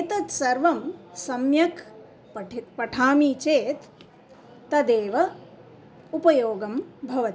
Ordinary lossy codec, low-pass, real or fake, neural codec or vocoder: none; none; real; none